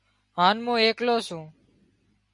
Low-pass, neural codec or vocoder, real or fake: 10.8 kHz; none; real